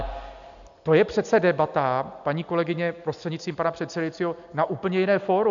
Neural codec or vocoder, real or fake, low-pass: none; real; 7.2 kHz